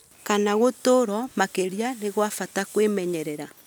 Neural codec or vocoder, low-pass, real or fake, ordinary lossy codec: none; none; real; none